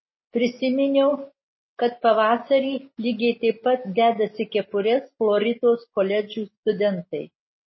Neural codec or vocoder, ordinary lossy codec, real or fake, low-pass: none; MP3, 24 kbps; real; 7.2 kHz